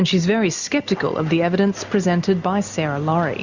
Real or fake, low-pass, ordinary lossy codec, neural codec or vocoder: real; 7.2 kHz; Opus, 64 kbps; none